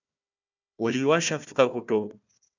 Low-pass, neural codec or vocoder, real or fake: 7.2 kHz; codec, 16 kHz, 1 kbps, FunCodec, trained on Chinese and English, 50 frames a second; fake